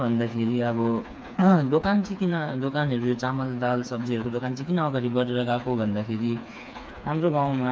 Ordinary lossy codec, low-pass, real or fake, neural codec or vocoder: none; none; fake; codec, 16 kHz, 4 kbps, FreqCodec, smaller model